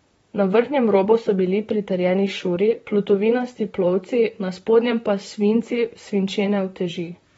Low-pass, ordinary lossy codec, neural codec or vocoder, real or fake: 19.8 kHz; AAC, 24 kbps; vocoder, 44.1 kHz, 128 mel bands, Pupu-Vocoder; fake